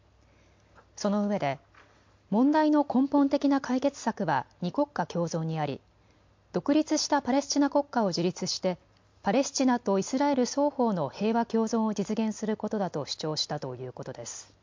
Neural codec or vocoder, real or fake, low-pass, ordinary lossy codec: none; real; 7.2 kHz; MP3, 48 kbps